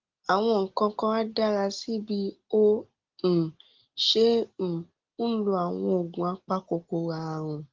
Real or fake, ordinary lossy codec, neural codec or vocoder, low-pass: real; Opus, 16 kbps; none; 7.2 kHz